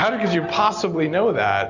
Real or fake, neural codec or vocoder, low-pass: real; none; 7.2 kHz